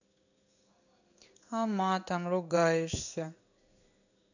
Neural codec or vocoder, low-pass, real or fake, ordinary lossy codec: codec, 16 kHz in and 24 kHz out, 1 kbps, XY-Tokenizer; 7.2 kHz; fake; none